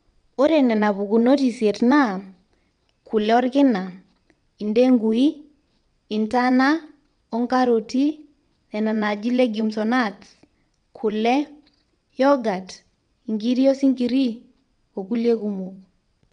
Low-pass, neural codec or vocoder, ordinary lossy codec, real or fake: 9.9 kHz; vocoder, 22.05 kHz, 80 mel bands, WaveNeXt; none; fake